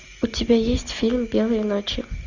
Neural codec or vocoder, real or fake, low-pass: none; real; 7.2 kHz